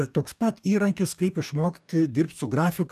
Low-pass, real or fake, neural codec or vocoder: 14.4 kHz; fake; codec, 44.1 kHz, 2.6 kbps, DAC